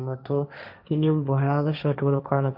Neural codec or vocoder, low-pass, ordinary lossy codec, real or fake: codec, 16 kHz, 1.1 kbps, Voila-Tokenizer; 5.4 kHz; none; fake